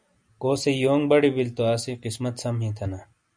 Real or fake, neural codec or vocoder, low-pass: real; none; 9.9 kHz